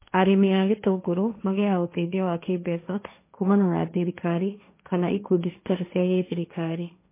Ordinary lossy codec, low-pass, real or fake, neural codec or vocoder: MP3, 24 kbps; 3.6 kHz; fake; codec, 16 kHz, 1.1 kbps, Voila-Tokenizer